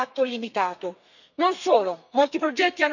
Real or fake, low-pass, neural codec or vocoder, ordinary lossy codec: fake; 7.2 kHz; codec, 32 kHz, 1.9 kbps, SNAC; none